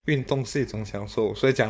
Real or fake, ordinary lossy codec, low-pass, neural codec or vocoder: fake; none; none; codec, 16 kHz, 4.8 kbps, FACodec